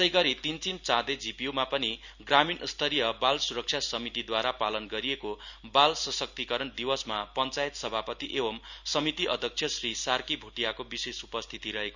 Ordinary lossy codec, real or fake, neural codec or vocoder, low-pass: none; real; none; 7.2 kHz